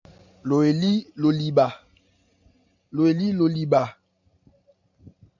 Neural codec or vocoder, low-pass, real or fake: none; 7.2 kHz; real